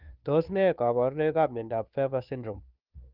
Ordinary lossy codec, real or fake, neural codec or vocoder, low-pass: Opus, 32 kbps; fake; codec, 16 kHz, 2 kbps, FunCodec, trained on Chinese and English, 25 frames a second; 5.4 kHz